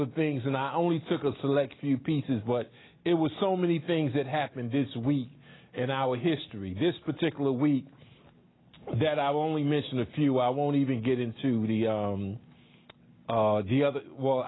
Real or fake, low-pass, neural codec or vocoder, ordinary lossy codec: real; 7.2 kHz; none; AAC, 16 kbps